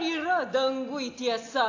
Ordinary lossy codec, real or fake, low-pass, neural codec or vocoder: AAC, 48 kbps; real; 7.2 kHz; none